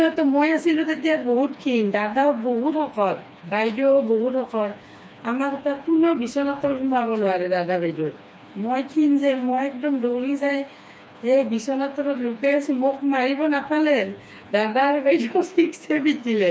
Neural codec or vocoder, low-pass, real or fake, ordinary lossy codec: codec, 16 kHz, 2 kbps, FreqCodec, smaller model; none; fake; none